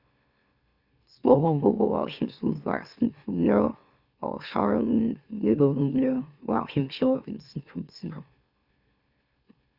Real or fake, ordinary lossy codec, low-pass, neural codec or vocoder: fake; Opus, 64 kbps; 5.4 kHz; autoencoder, 44.1 kHz, a latent of 192 numbers a frame, MeloTTS